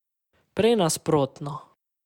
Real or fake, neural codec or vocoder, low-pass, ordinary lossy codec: real; none; 19.8 kHz; Opus, 64 kbps